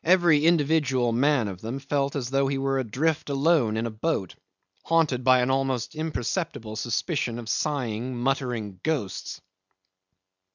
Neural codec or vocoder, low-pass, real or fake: none; 7.2 kHz; real